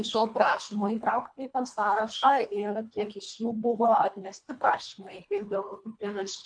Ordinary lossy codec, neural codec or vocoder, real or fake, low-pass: MP3, 64 kbps; codec, 24 kHz, 1.5 kbps, HILCodec; fake; 9.9 kHz